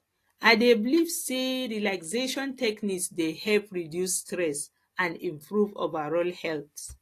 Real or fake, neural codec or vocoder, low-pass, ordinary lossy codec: real; none; 14.4 kHz; AAC, 48 kbps